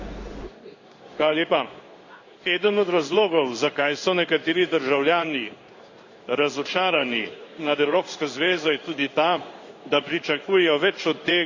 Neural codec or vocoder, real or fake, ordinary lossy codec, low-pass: codec, 16 kHz in and 24 kHz out, 1 kbps, XY-Tokenizer; fake; Opus, 64 kbps; 7.2 kHz